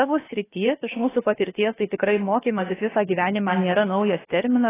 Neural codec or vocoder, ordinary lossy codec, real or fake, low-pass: codec, 16 kHz, about 1 kbps, DyCAST, with the encoder's durations; AAC, 16 kbps; fake; 3.6 kHz